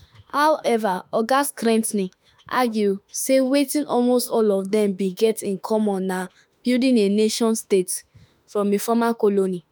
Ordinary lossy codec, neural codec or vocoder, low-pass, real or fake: none; autoencoder, 48 kHz, 32 numbers a frame, DAC-VAE, trained on Japanese speech; none; fake